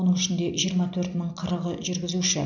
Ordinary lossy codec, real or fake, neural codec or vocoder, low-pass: none; real; none; 7.2 kHz